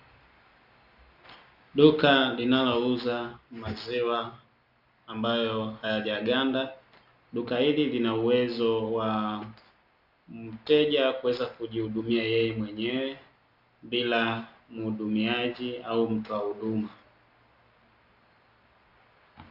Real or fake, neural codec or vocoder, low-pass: real; none; 5.4 kHz